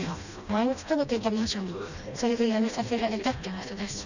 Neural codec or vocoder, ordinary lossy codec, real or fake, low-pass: codec, 16 kHz, 1 kbps, FreqCodec, smaller model; none; fake; 7.2 kHz